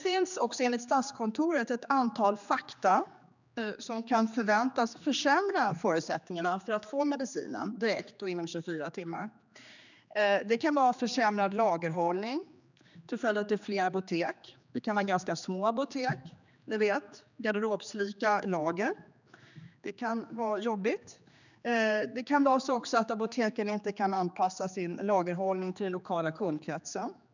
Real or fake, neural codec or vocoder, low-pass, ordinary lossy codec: fake; codec, 16 kHz, 2 kbps, X-Codec, HuBERT features, trained on general audio; 7.2 kHz; none